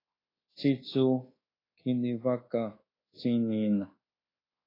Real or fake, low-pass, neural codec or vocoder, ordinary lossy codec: fake; 5.4 kHz; codec, 24 kHz, 1.2 kbps, DualCodec; AAC, 24 kbps